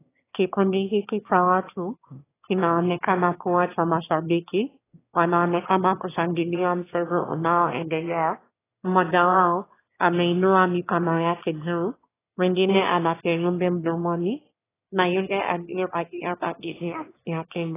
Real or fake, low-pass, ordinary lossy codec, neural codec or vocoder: fake; 3.6 kHz; AAC, 16 kbps; autoencoder, 22.05 kHz, a latent of 192 numbers a frame, VITS, trained on one speaker